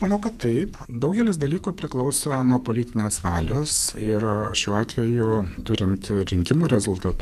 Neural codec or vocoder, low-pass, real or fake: codec, 44.1 kHz, 2.6 kbps, SNAC; 14.4 kHz; fake